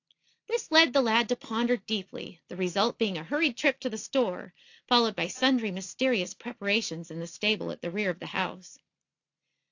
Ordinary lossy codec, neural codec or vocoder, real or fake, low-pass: AAC, 48 kbps; none; real; 7.2 kHz